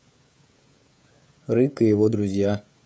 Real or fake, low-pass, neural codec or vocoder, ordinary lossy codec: fake; none; codec, 16 kHz, 16 kbps, FreqCodec, smaller model; none